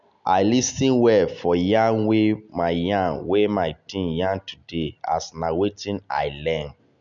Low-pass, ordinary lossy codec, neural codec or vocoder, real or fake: 7.2 kHz; none; none; real